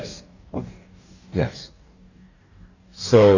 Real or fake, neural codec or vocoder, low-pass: fake; codec, 44.1 kHz, 2.6 kbps, DAC; 7.2 kHz